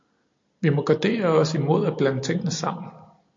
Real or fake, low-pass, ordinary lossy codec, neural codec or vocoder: real; 7.2 kHz; MP3, 48 kbps; none